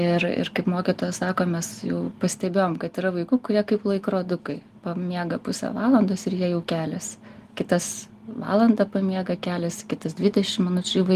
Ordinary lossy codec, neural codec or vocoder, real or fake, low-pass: Opus, 24 kbps; none; real; 14.4 kHz